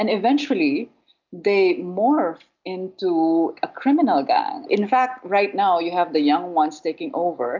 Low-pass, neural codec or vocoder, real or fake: 7.2 kHz; none; real